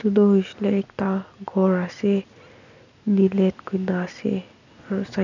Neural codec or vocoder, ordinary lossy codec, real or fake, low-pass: none; none; real; 7.2 kHz